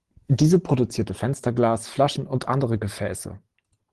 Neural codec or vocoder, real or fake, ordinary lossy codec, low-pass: none; real; Opus, 16 kbps; 10.8 kHz